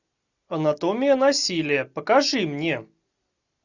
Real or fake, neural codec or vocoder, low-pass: real; none; 7.2 kHz